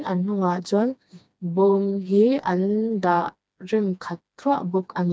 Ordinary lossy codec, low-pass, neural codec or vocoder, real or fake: none; none; codec, 16 kHz, 2 kbps, FreqCodec, smaller model; fake